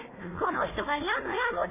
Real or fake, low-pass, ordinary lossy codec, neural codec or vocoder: fake; 3.6 kHz; MP3, 16 kbps; codec, 16 kHz, 1 kbps, FunCodec, trained on Chinese and English, 50 frames a second